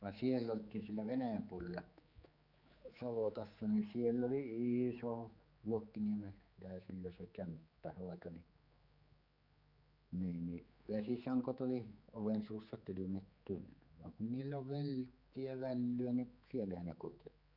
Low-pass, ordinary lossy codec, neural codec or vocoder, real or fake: 5.4 kHz; Opus, 64 kbps; codec, 16 kHz, 4 kbps, X-Codec, HuBERT features, trained on general audio; fake